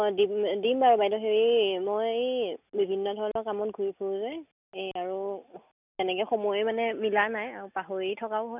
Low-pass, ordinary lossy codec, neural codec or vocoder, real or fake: 3.6 kHz; none; none; real